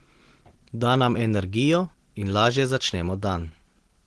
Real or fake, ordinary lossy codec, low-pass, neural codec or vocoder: real; Opus, 16 kbps; 10.8 kHz; none